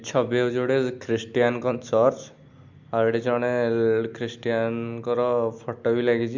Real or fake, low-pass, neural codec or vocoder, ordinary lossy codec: real; 7.2 kHz; none; MP3, 64 kbps